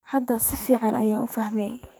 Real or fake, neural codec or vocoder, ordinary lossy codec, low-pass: fake; codec, 44.1 kHz, 2.6 kbps, SNAC; none; none